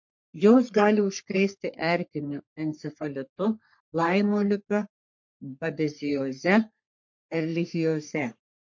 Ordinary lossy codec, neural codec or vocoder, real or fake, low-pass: MP3, 48 kbps; codec, 44.1 kHz, 3.4 kbps, Pupu-Codec; fake; 7.2 kHz